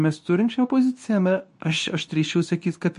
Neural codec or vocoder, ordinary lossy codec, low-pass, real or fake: codec, 24 kHz, 0.9 kbps, WavTokenizer, medium speech release version 2; MP3, 48 kbps; 10.8 kHz; fake